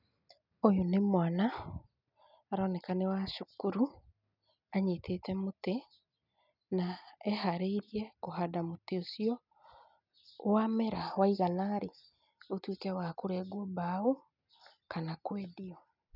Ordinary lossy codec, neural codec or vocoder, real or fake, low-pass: none; none; real; 5.4 kHz